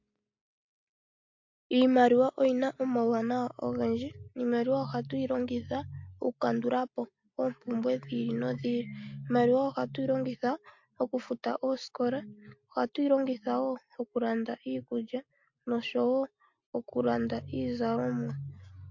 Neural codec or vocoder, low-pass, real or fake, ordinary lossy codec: none; 7.2 kHz; real; MP3, 48 kbps